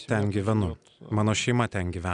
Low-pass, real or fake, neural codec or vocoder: 9.9 kHz; real; none